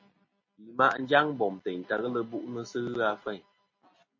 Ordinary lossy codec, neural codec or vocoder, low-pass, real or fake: MP3, 32 kbps; none; 7.2 kHz; real